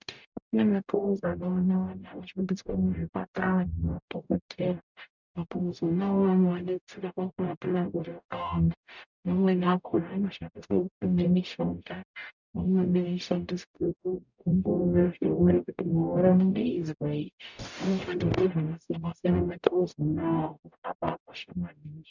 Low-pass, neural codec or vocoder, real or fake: 7.2 kHz; codec, 44.1 kHz, 0.9 kbps, DAC; fake